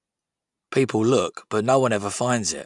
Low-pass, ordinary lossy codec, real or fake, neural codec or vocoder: 10.8 kHz; none; real; none